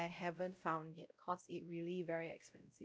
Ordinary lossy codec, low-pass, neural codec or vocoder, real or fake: none; none; codec, 16 kHz, 0.5 kbps, X-Codec, WavLM features, trained on Multilingual LibriSpeech; fake